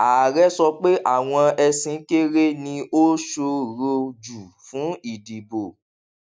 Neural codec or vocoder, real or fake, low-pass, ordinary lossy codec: none; real; none; none